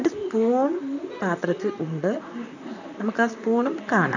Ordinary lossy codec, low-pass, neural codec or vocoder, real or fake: none; 7.2 kHz; vocoder, 44.1 kHz, 128 mel bands, Pupu-Vocoder; fake